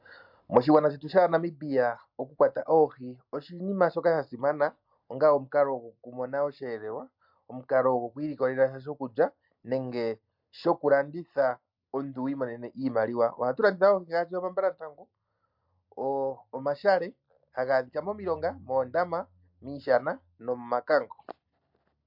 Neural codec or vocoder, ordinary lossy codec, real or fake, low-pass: none; MP3, 48 kbps; real; 5.4 kHz